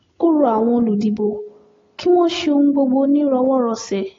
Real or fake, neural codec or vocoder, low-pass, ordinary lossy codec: real; none; 7.2 kHz; AAC, 32 kbps